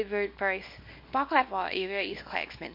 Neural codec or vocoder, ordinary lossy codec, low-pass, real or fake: codec, 24 kHz, 0.9 kbps, WavTokenizer, small release; MP3, 32 kbps; 5.4 kHz; fake